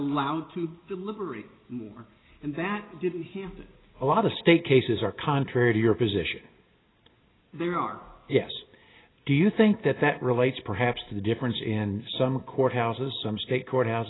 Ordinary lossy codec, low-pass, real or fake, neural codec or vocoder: AAC, 16 kbps; 7.2 kHz; real; none